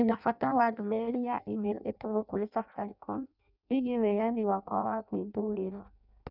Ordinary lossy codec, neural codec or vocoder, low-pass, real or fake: none; codec, 16 kHz in and 24 kHz out, 0.6 kbps, FireRedTTS-2 codec; 5.4 kHz; fake